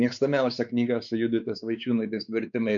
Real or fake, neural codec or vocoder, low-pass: fake; codec, 16 kHz, 4 kbps, X-Codec, WavLM features, trained on Multilingual LibriSpeech; 7.2 kHz